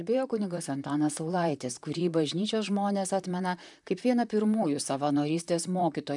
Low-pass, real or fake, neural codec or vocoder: 10.8 kHz; fake; vocoder, 44.1 kHz, 128 mel bands, Pupu-Vocoder